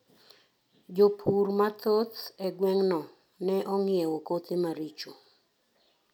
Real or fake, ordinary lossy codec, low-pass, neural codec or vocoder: real; none; 19.8 kHz; none